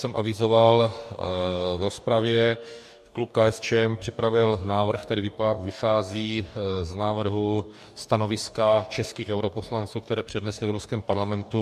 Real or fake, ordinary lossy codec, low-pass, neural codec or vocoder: fake; MP3, 96 kbps; 14.4 kHz; codec, 44.1 kHz, 2.6 kbps, DAC